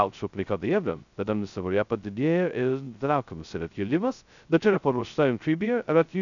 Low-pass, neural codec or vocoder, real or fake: 7.2 kHz; codec, 16 kHz, 0.2 kbps, FocalCodec; fake